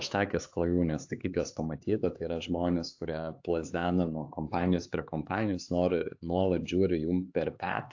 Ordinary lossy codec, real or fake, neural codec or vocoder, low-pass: AAC, 48 kbps; fake; codec, 16 kHz, 4 kbps, X-Codec, HuBERT features, trained on LibriSpeech; 7.2 kHz